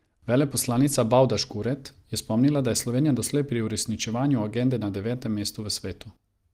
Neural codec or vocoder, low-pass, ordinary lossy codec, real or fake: none; 14.4 kHz; Opus, 24 kbps; real